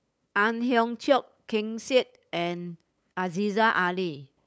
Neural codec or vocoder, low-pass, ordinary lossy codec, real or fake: codec, 16 kHz, 8 kbps, FunCodec, trained on LibriTTS, 25 frames a second; none; none; fake